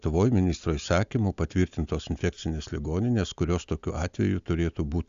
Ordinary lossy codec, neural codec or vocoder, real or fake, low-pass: Opus, 64 kbps; none; real; 7.2 kHz